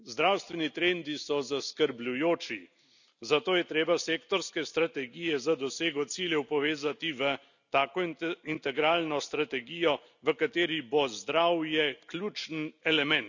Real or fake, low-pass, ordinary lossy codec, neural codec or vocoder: real; 7.2 kHz; none; none